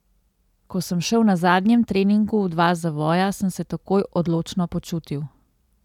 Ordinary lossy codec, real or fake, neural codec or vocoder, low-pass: none; real; none; 19.8 kHz